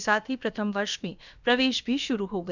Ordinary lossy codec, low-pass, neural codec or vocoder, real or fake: none; 7.2 kHz; codec, 16 kHz, about 1 kbps, DyCAST, with the encoder's durations; fake